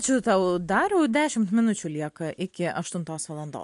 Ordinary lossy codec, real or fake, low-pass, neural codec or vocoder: AAC, 64 kbps; real; 10.8 kHz; none